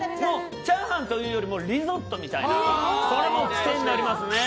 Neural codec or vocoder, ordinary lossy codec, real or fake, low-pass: none; none; real; none